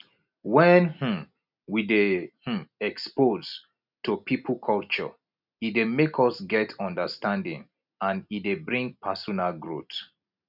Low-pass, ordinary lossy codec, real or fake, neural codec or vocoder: 5.4 kHz; none; real; none